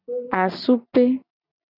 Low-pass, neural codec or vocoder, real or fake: 5.4 kHz; none; real